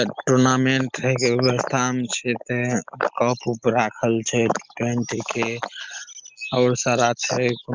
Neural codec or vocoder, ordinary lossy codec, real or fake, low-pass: none; Opus, 24 kbps; real; 7.2 kHz